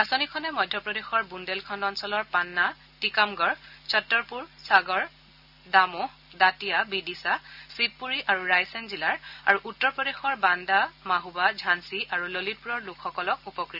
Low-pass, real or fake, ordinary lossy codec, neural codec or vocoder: 5.4 kHz; real; none; none